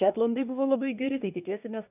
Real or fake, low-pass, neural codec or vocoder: fake; 3.6 kHz; codec, 24 kHz, 1 kbps, SNAC